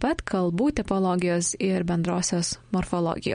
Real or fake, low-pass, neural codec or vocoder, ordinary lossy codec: real; 9.9 kHz; none; MP3, 48 kbps